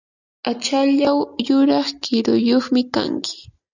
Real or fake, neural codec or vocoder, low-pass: real; none; 7.2 kHz